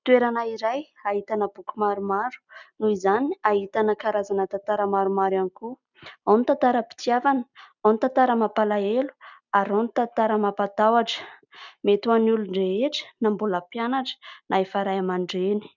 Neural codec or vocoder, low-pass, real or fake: none; 7.2 kHz; real